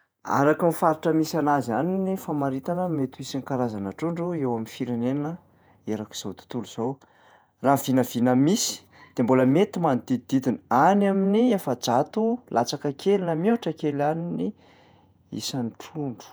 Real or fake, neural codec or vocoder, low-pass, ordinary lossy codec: fake; vocoder, 48 kHz, 128 mel bands, Vocos; none; none